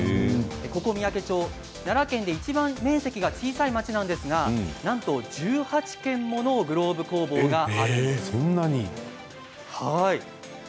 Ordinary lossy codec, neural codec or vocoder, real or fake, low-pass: none; none; real; none